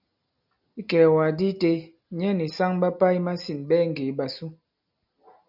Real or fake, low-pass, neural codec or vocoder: real; 5.4 kHz; none